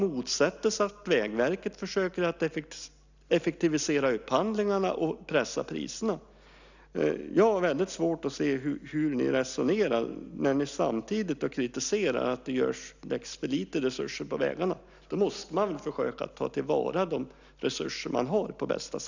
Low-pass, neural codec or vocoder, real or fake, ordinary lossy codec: 7.2 kHz; none; real; none